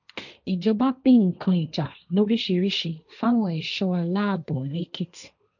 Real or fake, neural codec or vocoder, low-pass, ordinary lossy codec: fake; codec, 16 kHz, 1.1 kbps, Voila-Tokenizer; 7.2 kHz; none